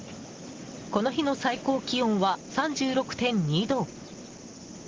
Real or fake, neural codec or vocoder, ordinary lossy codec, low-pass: real; none; Opus, 16 kbps; 7.2 kHz